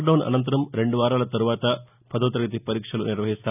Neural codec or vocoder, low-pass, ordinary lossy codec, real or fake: none; 3.6 kHz; none; real